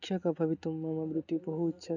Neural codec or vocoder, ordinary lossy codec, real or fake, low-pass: none; none; real; 7.2 kHz